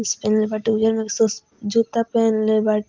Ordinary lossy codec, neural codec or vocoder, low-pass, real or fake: Opus, 32 kbps; none; 7.2 kHz; real